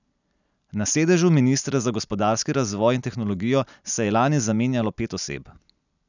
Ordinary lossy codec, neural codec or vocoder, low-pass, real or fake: none; none; 7.2 kHz; real